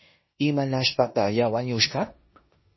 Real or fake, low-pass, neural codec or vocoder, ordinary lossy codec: fake; 7.2 kHz; codec, 16 kHz in and 24 kHz out, 0.9 kbps, LongCat-Audio-Codec, four codebook decoder; MP3, 24 kbps